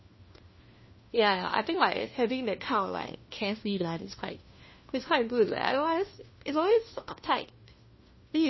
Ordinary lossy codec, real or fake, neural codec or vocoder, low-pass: MP3, 24 kbps; fake; codec, 16 kHz, 1 kbps, FunCodec, trained on LibriTTS, 50 frames a second; 7.2 kHz